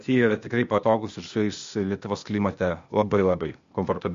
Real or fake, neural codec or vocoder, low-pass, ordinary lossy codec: fake; codec, 16 kHz, 0.8 kbps, ZipCodec; 7.2 kHz; MP3, 48 kbps